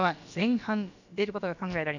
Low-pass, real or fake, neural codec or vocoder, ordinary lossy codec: 7.2 kHz; fake; codec, 16 kHz, about 1 kbps, DyCAST, with the encoder's durations; none